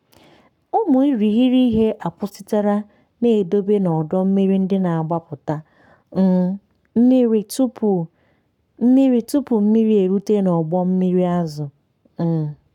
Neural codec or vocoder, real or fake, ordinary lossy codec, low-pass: codec, 44.1 kHz, 7.8 kbps, Pupu-Codec; fake; none; 19.8 kHz